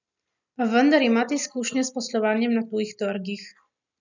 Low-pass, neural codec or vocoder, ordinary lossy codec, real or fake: 7.2 kHz; none; none; real